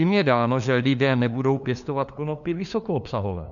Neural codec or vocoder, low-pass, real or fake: codec, 16 kHz, 2 kbps, FunCodec, trained on LibriTTS, 25 frames a second; 7.2 kHz; fake